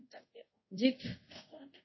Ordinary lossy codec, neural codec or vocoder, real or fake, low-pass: MP3, 24 kbps; codec, 24 kHz, 0.5 kbps, DualCodec; fake; 7.2 kHz